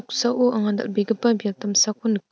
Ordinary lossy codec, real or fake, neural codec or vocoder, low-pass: none; real; none; none